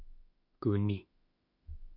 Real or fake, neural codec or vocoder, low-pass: fake; autoencoder, 48 kHz, 32 numbers a frame, DAC-VAE, trained on Japanese speech; 5.4 kHz